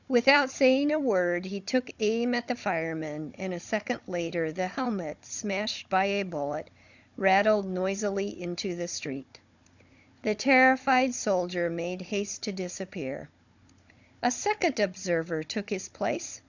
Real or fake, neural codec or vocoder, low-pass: fake; codec, 16 kHz, 16 kbps, FunCodec, trained on Chinese and English, 50 frames a second; 7.2 kHz